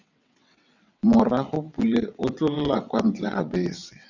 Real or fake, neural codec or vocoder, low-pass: fake; vocoder, 22.05 kHz, 80 mel bands, WaveNeXt; 7.2 kHz